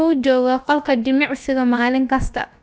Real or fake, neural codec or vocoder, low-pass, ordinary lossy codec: fake; codec, 16 kHz, about 1 kbps, DyCAST, with the encoder's durations; none; none